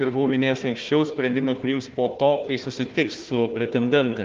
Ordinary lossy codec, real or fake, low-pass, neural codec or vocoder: Opus, 32 kbps; fake; 7.2 kHz; codec, 16 kHz, 1 kbps, FunCodec, trained on Chinese and English, 50 frames a second